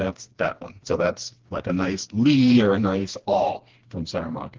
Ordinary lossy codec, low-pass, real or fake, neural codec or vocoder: Opus, 16 kbps; 7.2 kHz; fake; codec, 16 kHz, 2 kbps, FreqCodec, smaller model